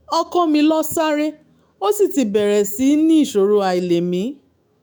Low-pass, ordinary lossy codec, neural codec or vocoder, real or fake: none; none; autoencoder, 48 kHz, 128 numbers a frame, DAC-VAE, trained on Japanese speech; fake